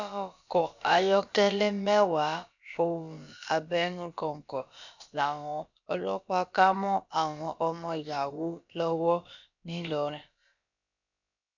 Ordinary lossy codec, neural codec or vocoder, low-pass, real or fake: none; codec, 16 kHz, about 1 kbps, DyCAST, with the encoder's durations; 7.2 kHz; fake